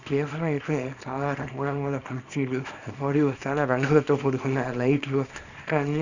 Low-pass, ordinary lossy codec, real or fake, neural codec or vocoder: 7.2 kHz; none; fake; codec, 24 kHz, 0.9 kbps, WavTokenizer, small release